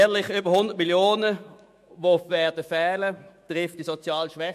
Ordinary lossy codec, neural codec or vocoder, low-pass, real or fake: MP3, 64 kbps; autoencoder, 48 kHz, 128 numbers a frame, DAC-VAE, trained on Japanese speech; 14.4 kHz; fake